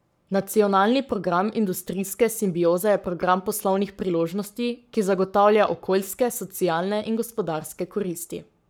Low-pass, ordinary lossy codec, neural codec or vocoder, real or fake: none; none; codec, 44.1 kHz, 7.8 kbps, Pupu-Codec; fake